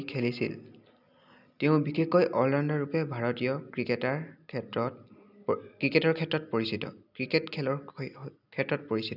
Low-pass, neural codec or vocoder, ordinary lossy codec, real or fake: 5.4 kHz; none; none; real